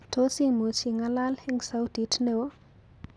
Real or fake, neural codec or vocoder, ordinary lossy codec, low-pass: real; none; none; none